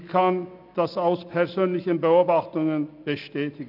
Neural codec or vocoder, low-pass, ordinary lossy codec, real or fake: none; 5.4 kHz; none; real